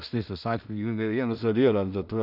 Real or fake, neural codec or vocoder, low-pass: fake; codec, 16 kHz in and 24 kHz out, 0.4 kbps, LongCat-Audio-Codec, two codebook decoder; 5.4 kHz